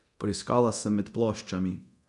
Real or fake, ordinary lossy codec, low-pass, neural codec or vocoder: fake; none; 10.8 kHz; codec, 24 kHz, 0.9 kbps, DualCodec